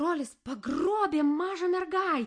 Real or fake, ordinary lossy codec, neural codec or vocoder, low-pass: real; MP3, 48 kbps; none; 9.9 kHz